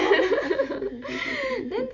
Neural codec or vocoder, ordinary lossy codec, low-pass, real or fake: none; MP3, 48 kbps; 7.2 kHz; real